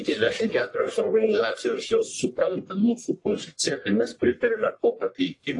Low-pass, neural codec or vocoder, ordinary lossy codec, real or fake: 10.8 kHz; codec, 44.1 kHz, 1.7 kbps, Pupu-Codec; AAC, 48 kbps; fake